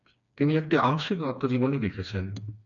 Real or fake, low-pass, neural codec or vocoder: fake; 7.2 kHz; codec, 16 kHz, 2 kbps, FreqCodec, smaller model